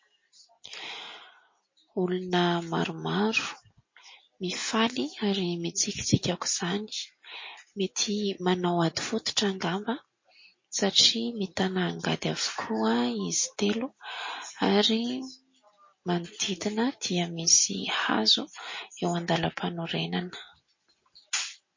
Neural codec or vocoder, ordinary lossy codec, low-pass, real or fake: none; MP3, 32 kbps; 7.2 kHz; real